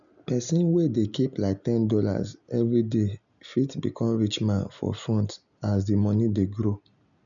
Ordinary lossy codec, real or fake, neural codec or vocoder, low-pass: MP3, 96 kbps; real; none; 7.2 kHz